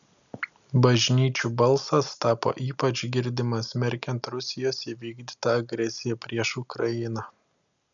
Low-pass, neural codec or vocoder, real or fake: 7.2 kHz; none; real